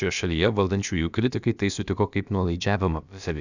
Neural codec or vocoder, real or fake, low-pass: codec, 16 kHz, about 1 kbps, DyCAST, with the encoder's durations; fake; 7.2 kHz